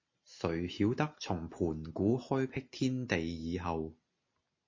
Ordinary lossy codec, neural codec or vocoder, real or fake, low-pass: MP3, 32 kbps; none; real; 7.2 kHz